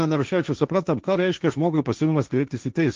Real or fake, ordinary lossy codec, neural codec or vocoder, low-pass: fake; Opus, 32 kbps; codec, 16 kHz, 1.1 kbps, Voila-Tokenizer; 7.2 kHz